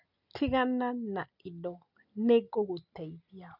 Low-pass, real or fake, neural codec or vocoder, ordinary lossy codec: 5.4 kHz; real; none; none